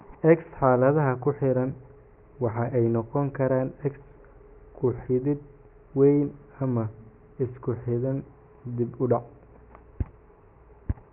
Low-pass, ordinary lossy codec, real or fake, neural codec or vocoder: 3.6 kHz; Opus, 32 kbps; real; none